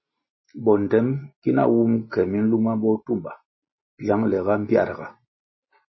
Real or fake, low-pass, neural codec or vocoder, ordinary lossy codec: real; 7.2 kHz; none; MP3, 24 kbps